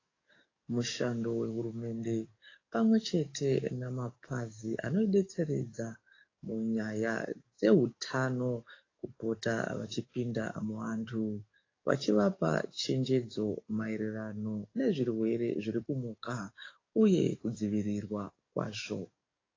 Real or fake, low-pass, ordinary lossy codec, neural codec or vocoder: fake; 7.2 kHz; AAC, 32 kbps; codec, 44.1 kHz, 7.8 kbps, DAC